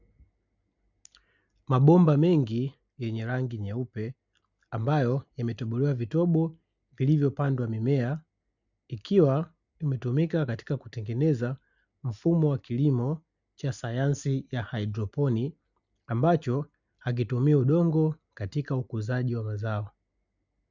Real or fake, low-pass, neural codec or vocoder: real; 7.2 kHz; none